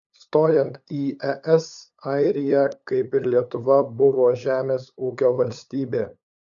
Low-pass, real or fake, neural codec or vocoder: 7.2 kHz; fake; codec, 16 kHz, 8 kbps, FunCodec, trained on LibriTTS, 25 frames a second